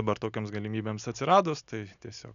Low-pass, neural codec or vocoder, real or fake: 7.2 kHz; none; real